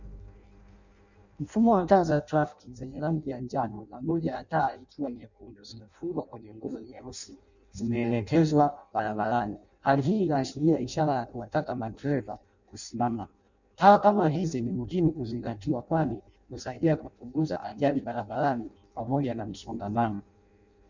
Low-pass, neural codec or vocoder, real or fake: 7.2 kHz; codec, 16 kHz in and 24 kHz out, 0.6 kbps, FireRedTTS-2 codec; fake